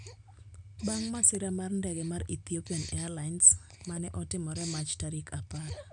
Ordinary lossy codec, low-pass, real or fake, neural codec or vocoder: none; 9.9 kHz; real; none